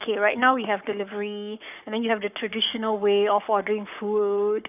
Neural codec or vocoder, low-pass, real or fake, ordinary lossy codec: codec, 44.1 kHz, 7.8 kbps, Pupu-Codec; 3.6 kHz; fake; none